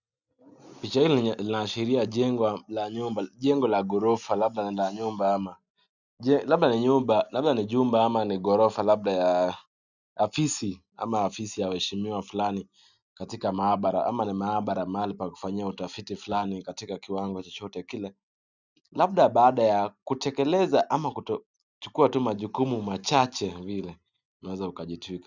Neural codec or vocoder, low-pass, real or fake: none; 7.2 kHz; real